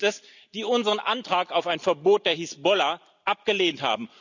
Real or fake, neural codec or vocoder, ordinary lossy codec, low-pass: real; none; none; 7.2 kHz